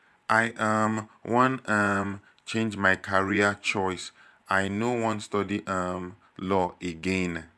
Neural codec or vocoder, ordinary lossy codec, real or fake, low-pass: vocoder, 24 kHz, 100 mel bands, Vocos; none; fake; none